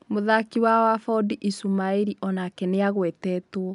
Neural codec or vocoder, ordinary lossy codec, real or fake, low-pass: none; none; real; 10.8 kHz